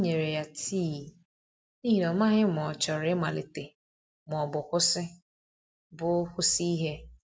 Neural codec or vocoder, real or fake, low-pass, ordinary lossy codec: none; real; none; none